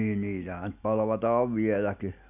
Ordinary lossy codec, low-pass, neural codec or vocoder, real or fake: none; 3.6 kHz; none; real